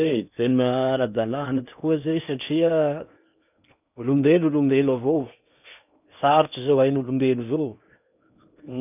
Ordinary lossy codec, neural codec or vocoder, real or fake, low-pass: none; codec, 16 kHz in and 24 kHz out, 0.8 kbps, FocalCodec, streaming, 65536 codes; fake; 3.6 kHz